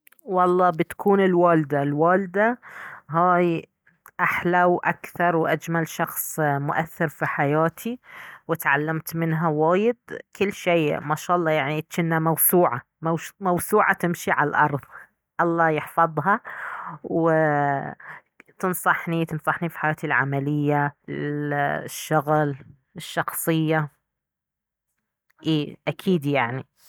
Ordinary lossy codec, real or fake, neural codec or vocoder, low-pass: none; real; none; none